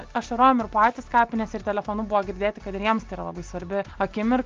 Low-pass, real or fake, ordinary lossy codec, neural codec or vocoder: 7.2 kHz; real; Opus, 24 kbps; none